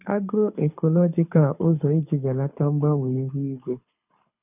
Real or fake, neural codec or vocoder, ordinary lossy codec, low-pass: fake; codec, 24 kHz, 6 kbps, HILCodec; none; 3.6 kHz